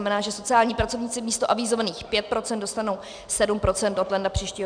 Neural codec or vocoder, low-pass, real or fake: none; 9.9 kHz; real